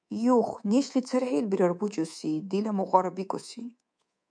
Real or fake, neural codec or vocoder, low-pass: fake; codec, 24 kHz, 3.1 kbps, DualCodec; 9.9 kHz